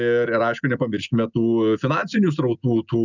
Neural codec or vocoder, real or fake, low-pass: none; real; 7.2 kHz